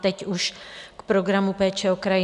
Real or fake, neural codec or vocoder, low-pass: real; none; 10.8 kHz